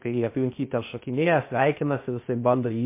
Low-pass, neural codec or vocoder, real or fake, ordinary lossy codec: 3.6 kHz; codec, 16 kHz, 0.8 kbps, ZipCodec; fake; MP3, 24 kbps